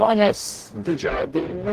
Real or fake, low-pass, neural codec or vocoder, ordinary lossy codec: fake; 14.4 kHz; codec, 44.1 kHz, 0.9 kbps, DAC; Opus, 16 kbps